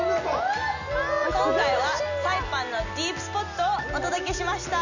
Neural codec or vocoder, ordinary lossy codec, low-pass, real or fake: none; none; 7.2 kHz; real